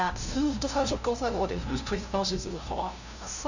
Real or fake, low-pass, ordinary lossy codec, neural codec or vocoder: fake; 7.2 kHz; none; codec, 16 kHz, 0.5 kbps, FunCodec, trained on LibriTTS, 25 frames a second